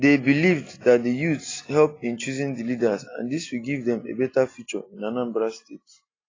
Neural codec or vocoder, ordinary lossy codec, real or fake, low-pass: none; AAC, 32 kbps; real; 7.2 kHz